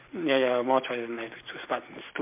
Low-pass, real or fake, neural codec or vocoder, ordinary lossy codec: 3.6 kHz; real; none; MP3, 32 kbps